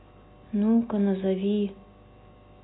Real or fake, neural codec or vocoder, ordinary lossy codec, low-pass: real; none; AAC, 16 kbps; 7.2 kHz